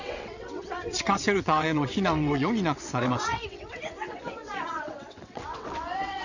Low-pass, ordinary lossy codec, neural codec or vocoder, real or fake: 7.2 kHz; none; vocoder, 22.05 kHz, 80 mel bands, WaveNeXt; fake